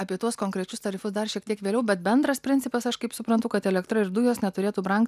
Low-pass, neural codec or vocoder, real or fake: 14.4 kHz; none; real